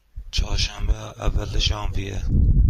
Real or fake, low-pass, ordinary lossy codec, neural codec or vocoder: fake; 14.4 kHz; MP3, 64 kbps; vocoder, 44.1 kHz, 128 mel bands every 256 samples, BigVGAN v2